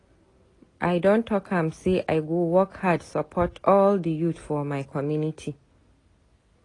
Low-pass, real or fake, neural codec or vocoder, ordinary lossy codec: 10.8 kHz; real; none; AAC, 32 kbps